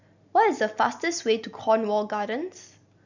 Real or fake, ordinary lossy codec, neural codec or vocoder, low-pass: real; none; none; 7.2 kHz